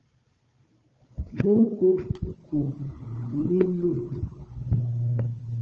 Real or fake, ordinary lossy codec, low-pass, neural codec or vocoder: fake; Opus, 24 kbps; 7.2 kHz; codec, 16 kHz, 4 kbps, FunCodec, trained on Chinese and English, 50 frames a second